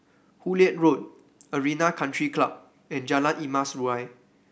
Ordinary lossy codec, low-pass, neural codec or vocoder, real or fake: none; none; none; real